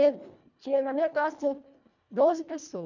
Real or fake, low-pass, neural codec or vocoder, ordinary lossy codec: fake; 7.2 kHz; codec, 24 kHz, 1.5 kbps, HILCodec; none